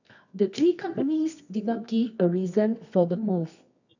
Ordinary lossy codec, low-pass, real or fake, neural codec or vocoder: none; 7.2 kHz; fake; codec, 24 kHz, 0.9 kbps, WavTokenizer, medium music audio release